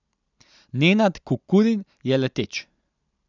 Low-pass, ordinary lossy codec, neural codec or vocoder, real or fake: 7.2 kHz; none; none; real